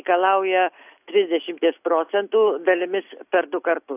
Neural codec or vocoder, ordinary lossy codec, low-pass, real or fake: none; AAC, 32 kbps; 3.6 kHz; real